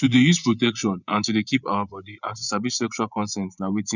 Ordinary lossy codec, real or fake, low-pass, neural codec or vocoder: none; fake; 7.2 kHz; vocoder, 24 kHz, 100 mel bands, Vocos